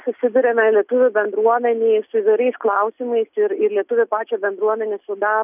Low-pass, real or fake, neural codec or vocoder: 3.6 kHz; real; none